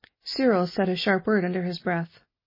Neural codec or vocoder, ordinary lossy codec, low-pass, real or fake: none; MP3, 24 kbps; 5.4 kHz; real